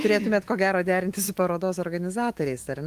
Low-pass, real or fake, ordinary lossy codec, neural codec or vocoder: 14.4 kHz; fake; Opus, 24 kbps; autoencoder, 48 kHz, 128 numbers a frame, DAC-VAE, trained on Japanese speech